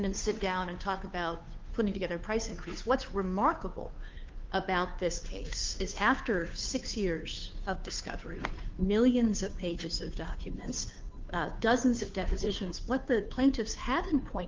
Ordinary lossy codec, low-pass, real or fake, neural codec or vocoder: Opus, 24 kbps; 7.2 kHz; fake; codec, 16 kHz, 2 kbps, FunCodec, trained on Chinese and English, 25 frames a second